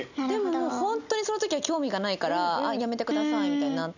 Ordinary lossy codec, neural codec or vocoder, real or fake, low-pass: none; none; real; 7.2 kHz